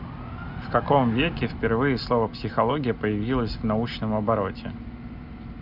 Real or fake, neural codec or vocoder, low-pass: real; none; 5.4 kHz